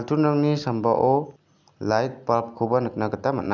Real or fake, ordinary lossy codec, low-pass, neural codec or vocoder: real; none; 7.2 kHz; none